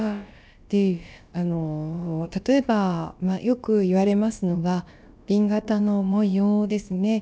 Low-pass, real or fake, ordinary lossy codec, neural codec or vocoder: none; fake; none; codec, 16 kHz, about 1 kbps, DyCAST, with the encoder's durations